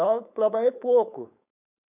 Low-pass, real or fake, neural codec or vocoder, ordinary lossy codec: 3.6 kHz; fake; codec, 16 kHz, 4.8 kbps, FACodec; none